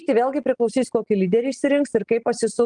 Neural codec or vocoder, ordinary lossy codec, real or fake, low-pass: none; Opus, 24 kbps; real; 9.9 kHz